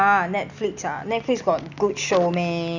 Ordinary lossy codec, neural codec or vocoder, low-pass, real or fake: none; none; 7.2 kHz; real